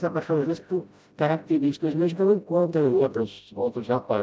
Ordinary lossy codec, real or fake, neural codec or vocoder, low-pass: none; fake; codec, 16 kHz, 0.5 kbps, FreqCodec, smaller model; none